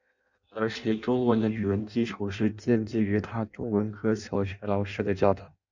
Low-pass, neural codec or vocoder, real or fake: 7.2 kHz; codec, 16 kHz in and 24 kHz out, 0.6 kbps, FireRedTTS-2 codec; fake